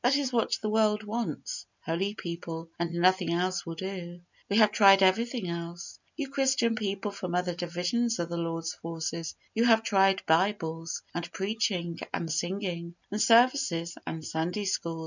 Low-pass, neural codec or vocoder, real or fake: 7.2 kHz; none; real